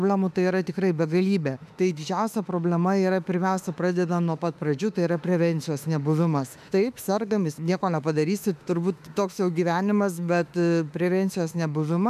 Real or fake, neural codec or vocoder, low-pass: fake; autoencoder, 48 kHz, 32 numbers a frame, DAC-VAE, trained on Japanese speech; 14.4 kHz